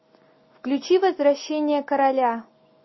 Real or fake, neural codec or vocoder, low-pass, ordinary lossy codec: real; none; 7.2 kHz; MP3, 24 kbps